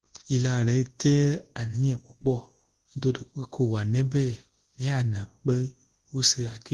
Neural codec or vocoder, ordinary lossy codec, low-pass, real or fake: codec, 24 kHz, 0.9 kbps, WavTokenizer, large speech release; Opus, 16 kbps; 10.8 kHz; fake